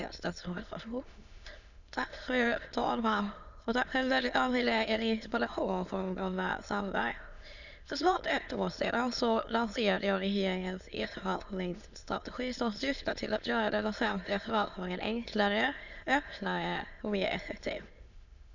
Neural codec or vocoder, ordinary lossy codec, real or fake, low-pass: autoencoder, 22.05 kHz, a latent of 192 numbers a frame, VITS, trained on many speakers; none; fake; 7.2 kHz